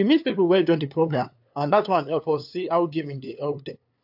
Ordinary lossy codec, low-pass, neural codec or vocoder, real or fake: AAC, 48 kbps; 5.4 kHz; codec, 16 kHz, 2 kbps, FunCodec, trained on LibriTTS, 25 frames a second; fake